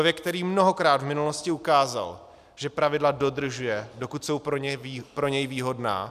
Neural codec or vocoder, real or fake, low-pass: none; real; 14.4 kHz